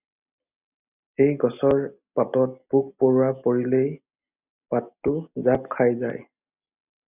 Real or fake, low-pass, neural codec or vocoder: real; 3.6 kHz; none